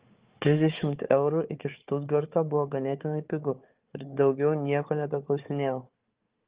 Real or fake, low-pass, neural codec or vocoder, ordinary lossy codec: fake; 3.6 kHz; codec, 16 kHz, 4 kbps, FunCodec, trained on Chinese and English, 50 frames a second; Opus, 32 kbps